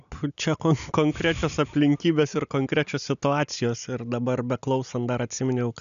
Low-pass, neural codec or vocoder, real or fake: 7.2 kHz; none; real